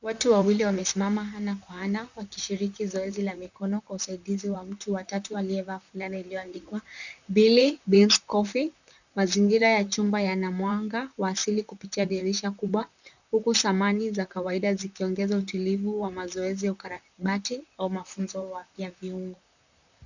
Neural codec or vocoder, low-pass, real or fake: vocoder, 44.1 kHz, 128 mel bands, Pupu-Vocoder; 7.2 kHz; fake